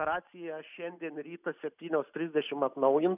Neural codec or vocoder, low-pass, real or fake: none; 3.6 kHz; real